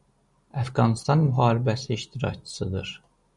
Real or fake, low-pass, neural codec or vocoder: real; 10.8 kHz; none